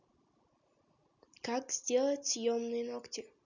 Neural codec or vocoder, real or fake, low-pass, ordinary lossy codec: none; real; 7.2 kHz; none